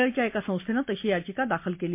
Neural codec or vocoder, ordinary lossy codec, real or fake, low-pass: none; MP3, 24 kbps; real; 3.6 kHz